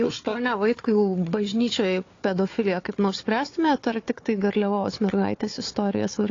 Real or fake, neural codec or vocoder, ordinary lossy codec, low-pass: fake; codec, 16 kHz, 4 kbps, FunCodec, trained on Chinese and English, 50 frames a second; AAC, 32 kbps; 7.2 kHz